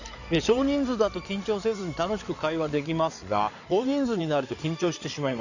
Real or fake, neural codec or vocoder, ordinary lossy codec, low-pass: fake; codec, 16 kHz in and 24 kHz out, 2.2 kbps, FireRedTTS-2 codec; none; 7.2 kHz